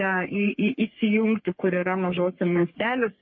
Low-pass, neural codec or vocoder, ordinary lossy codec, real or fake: 7.2 kHz; codec, 44.1 kHz, 3.4 kbps, Pupu-Codec; MP3, 32 kbps; fake